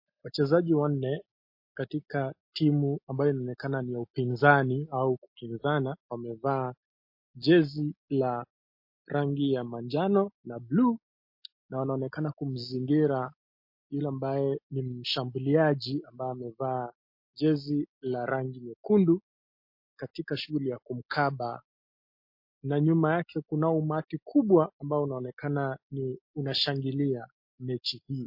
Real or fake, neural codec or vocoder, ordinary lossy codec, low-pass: real; none; MP3, 32 kbps; 5.4 kHz